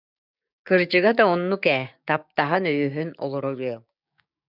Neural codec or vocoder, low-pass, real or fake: codec, 16 kHz, 6 kbps, DAC; 5.4 kHz; fake